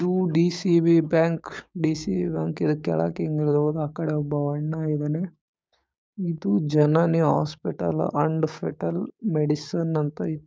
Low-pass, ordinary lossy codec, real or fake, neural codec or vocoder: none; none; fake; codec, 16 kHz, 6 kbps, DAC